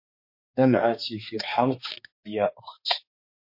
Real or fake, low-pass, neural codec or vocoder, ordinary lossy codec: fake; 5.4 kHz; codec, 16 kHz, 2 kbps, X-Codec, HuBERT features, trained on balanced general audio; MP3, 32 kbps